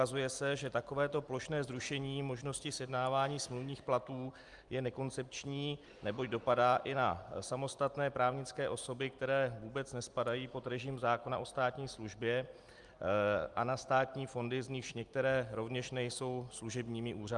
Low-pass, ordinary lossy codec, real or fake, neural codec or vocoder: 10.8 kHz; Opus, 32 kbps; real; none